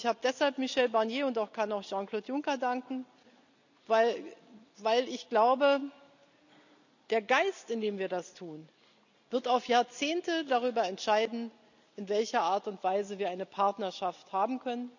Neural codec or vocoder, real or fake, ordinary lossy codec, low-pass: none; real; none; 7.2 kHz